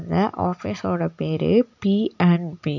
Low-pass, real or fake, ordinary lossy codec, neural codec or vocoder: 7.2 kHz; real; none; none